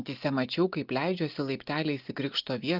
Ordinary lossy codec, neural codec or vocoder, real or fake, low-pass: Opus, 32 kbps; none; real; 5.4 kHz